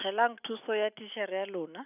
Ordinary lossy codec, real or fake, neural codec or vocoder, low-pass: none; real; none; 3.6 kHz